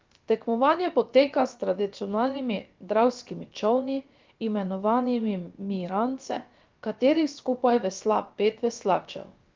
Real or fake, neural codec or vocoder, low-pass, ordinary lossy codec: fake; codec, 16 kHz, about 1 kbps, DyCAST, with the encoder's durations; 7.2 kHz; Opus, 24 kbps